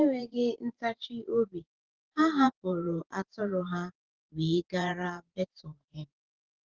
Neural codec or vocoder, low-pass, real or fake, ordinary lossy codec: vocoder, 44.1 kHz, 128 mel bands every 512 samples, BigVGAN v2; 7.2 kHz; fake; Opus, 16 kbps